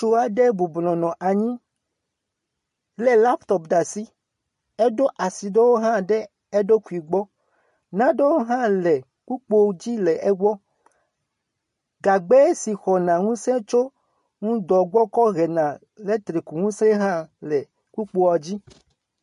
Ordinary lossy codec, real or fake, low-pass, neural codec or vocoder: MP3, 48 kbps; real; 10.8 kHz; none